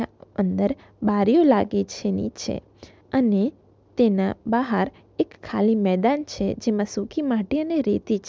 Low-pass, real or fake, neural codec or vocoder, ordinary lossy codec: none; real; none; none